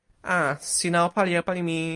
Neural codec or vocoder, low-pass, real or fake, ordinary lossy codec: none; 10.8 kHz; real; MP3, 48 kbps